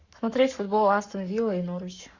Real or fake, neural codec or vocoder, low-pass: fake; codec, 44.1 kHz, 7.8 kbps, Pupu-Codec; 7.2 kHz